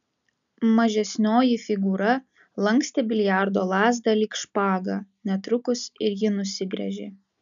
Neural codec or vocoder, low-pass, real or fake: none; 7.2 kHz; real